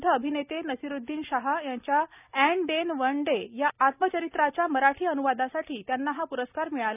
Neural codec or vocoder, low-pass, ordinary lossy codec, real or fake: none; 3.6 kHz; none; real